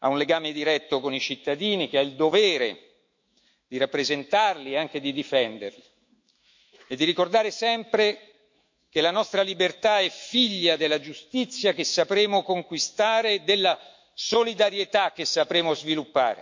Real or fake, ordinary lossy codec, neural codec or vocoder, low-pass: fake; MP3, 48 kbps; autoencoder, 48 kHz, 128 numbers a frame, DAC-VAE, trained on Japanese speech; 7.2 kHz